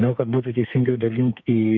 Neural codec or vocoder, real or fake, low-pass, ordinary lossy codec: codec, 32 kHz, 1.9 kbps, SNAC; fake; 7.2 kHz; MP3, 64 kbps